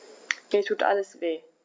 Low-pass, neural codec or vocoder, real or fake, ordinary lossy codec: none; none; real; none